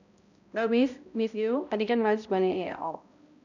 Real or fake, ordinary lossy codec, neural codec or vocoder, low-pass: fake; none; codec, 16 kHz, 0.5 kbps, X-Codec, HuBERT features, trained on balanced general audio; 7.2 kHz